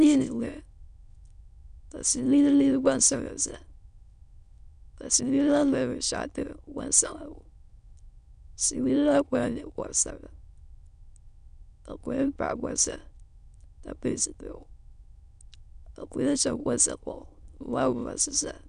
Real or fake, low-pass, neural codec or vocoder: fake; 9.9 kHz; autoencoder, 22.05 kHz, a latent of 192 numbers a frame, VITS, trained on many speakers